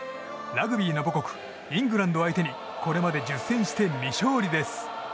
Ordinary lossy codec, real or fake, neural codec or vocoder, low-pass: none; real; none; none